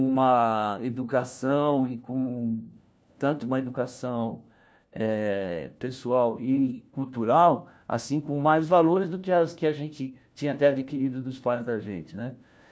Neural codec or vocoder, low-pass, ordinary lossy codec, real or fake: codec, 16 kHz, 1 kbps, FunCodec, trained on LibriTTS, 50 frames a second; none; none; fake